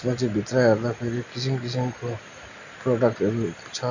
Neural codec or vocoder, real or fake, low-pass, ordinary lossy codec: none; real; 7.2 kHz; none